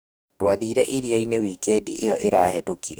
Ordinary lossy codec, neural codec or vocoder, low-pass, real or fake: none; codec, 44.1 kHz, 2.6 kbps, DAC; none; fake